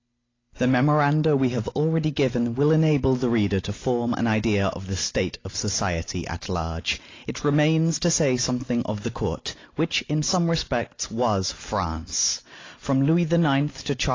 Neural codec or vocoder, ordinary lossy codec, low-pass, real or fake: none; AAC, 32 kbps; 7.2 kHz; real